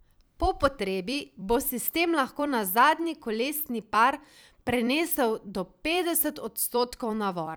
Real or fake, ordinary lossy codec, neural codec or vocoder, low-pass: fake; none; vocoder, 44.1 kHz, 128 mel bands every 256 samples, BigVGAN v2; none